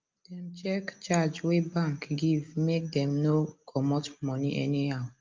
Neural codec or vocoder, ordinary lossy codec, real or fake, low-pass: none; Opus, 24 kbps; real; 7.2 kHz